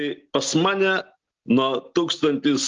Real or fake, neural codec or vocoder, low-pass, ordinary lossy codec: real; none; 7.2 kHz; Opus, 24 kbps